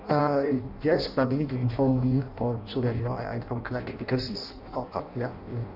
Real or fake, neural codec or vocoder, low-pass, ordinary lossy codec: fake; codec, 16 kHz in and 24 kHz out, 0.6 kbps, FireRedTTS-2 codec; 5.4 kHz; none